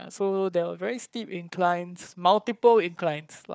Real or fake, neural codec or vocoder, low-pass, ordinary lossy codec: fake; codec, 16 kHz, 4 kbps, FunCodec, trained on Chinese and English, 50 frames a second; none; none